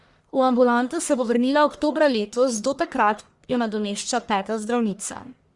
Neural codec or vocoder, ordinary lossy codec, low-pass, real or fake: codec, 44.1 kHz, 1.7 kbps, Pupu-Codec; Opus, 64 kbps; 10.8 kHz; fake